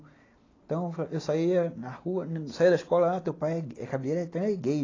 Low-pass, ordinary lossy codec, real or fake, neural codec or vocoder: 7.2 kHz; AAC, 32 kbps; real; none